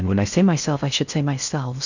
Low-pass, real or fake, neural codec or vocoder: 7.2 kHz; fake; codec, 16 kHz in and 24 kHz out, 0.6 kbps, FocalCodec, streaming, 4096 codes